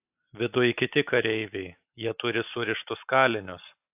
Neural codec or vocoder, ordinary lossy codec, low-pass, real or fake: none; AAC, 32 kbps; 3.6 kHz; real